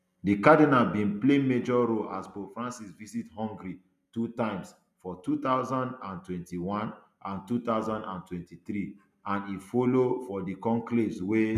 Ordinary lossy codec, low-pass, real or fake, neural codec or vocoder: none; 14.4 kHz; real; none